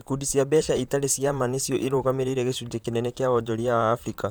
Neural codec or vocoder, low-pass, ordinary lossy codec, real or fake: vocoder, 44.1 kHz, 128 mel bands, Pupu-Vocoder; none; none; fake